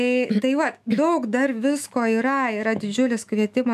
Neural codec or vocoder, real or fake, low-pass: autoencoder, 48 kHz, 128 numbers a frame, DAC-VAE, trained on Japanese speech; fake; 14.4 kHz